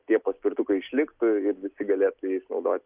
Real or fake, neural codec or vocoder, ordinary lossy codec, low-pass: real; none; Opus, 24 kbps; 3.6 kHz